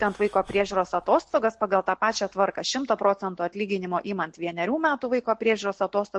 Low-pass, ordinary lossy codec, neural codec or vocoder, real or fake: 10.8 kHz; MP3, 48 kbps; none; real